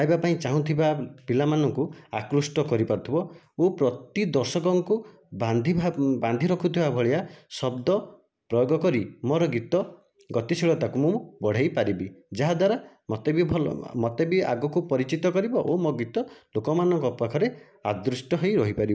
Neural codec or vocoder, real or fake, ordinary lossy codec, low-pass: none; real; none; none